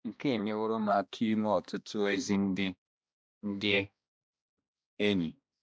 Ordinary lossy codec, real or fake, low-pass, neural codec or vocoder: none; fake; none; codec, 16 kHz, 1 kbps, X-Codec, HuBERT features, trained on balanced general audio